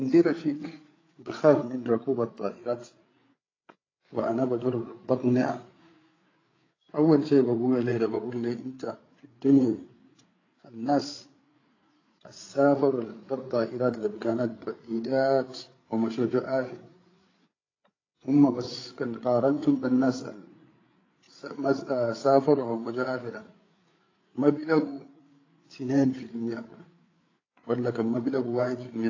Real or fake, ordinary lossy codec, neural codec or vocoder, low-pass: fake; AAC, 32 kbps; codec, 16 kHz, 8 kbps, FreqCodec, larger model; 7.2 kHz